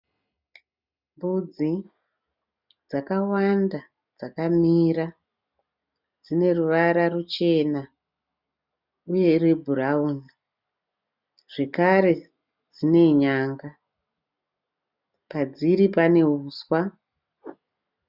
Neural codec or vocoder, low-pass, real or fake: none; 5.4 kHz; real